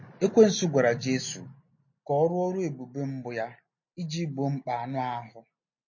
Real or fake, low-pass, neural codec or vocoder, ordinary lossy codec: real; 7.2 kHz; none; MP3, 32 kbps